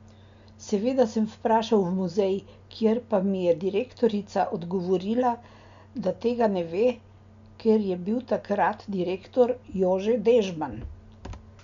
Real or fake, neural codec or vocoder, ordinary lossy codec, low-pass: real; none; MP3, 64 kbps; 7.2 kHz